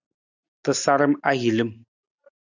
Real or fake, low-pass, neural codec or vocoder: real; 7.2 kHz; none